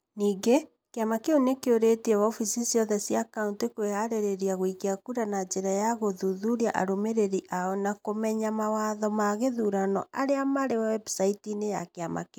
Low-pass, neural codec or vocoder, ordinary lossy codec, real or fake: none; none; none; real